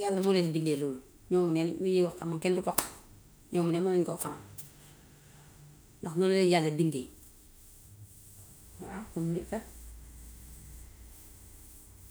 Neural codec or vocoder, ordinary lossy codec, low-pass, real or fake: autoencoder, 48 kHz, 32 numbers a frame, DAC-VAE, trained on Japanese speech; none; none; fake